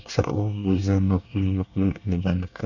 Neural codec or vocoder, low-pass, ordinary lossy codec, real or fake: codec, 24 kHz, 1 kbps, SNAC; 7.2 kHz; none; fake